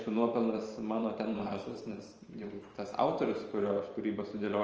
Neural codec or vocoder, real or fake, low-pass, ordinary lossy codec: vocoder, 44.1 kHz, 128 mel bands every 512 samples, BigVGAN v2; fake; 7.2 kHz; Opus, 24 kbps